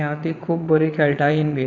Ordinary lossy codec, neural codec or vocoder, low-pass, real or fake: none; vocoder, 22.05 kHz, 80 mel bands, Vocos; 7.2 kHz; fake